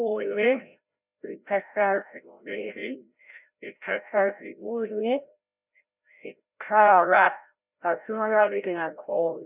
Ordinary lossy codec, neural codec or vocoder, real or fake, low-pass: none; codec, 16 kHz, 0.5 kbps, FreqCodec, larger model; fake; 3.6 kHz